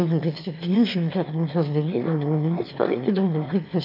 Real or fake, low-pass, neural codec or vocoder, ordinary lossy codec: fake; 5.4 kHz; autoencoder, 22.05 kHz, a latent of 192 numbers a frame, VITS, trained on one speaker; AAC, 48 kbps